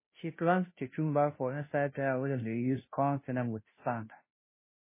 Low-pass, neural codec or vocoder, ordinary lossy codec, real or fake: 3.6 kHz; codec, 16 kHz, 0.5 kbps, FunCodec, trained on Chinese and English, 25 frames a second; MP3, 16 kbps; fake